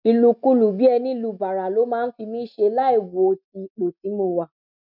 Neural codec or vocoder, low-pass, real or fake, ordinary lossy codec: none; 5.4 kHz; real; none